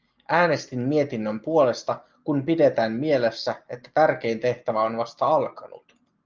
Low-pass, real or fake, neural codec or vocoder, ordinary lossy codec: 7.2 kHz; real; none; Opus, 24 kbps